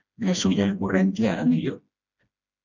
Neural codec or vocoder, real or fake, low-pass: codec, 16 kHz, 1 kbps, FreqCodec, smaller model; fake; 7.2 kHz